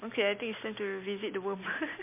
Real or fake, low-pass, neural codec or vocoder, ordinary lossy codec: real; 3.6 kHz; none; MP3, 24 kbps